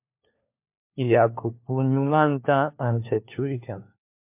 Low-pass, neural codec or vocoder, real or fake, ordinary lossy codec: 3.6 kHz; codec, 16 kHz, 1 kbps, FunCodec, trained on LibriTTS, 50 frames a second; fake; MP3, 32 kbps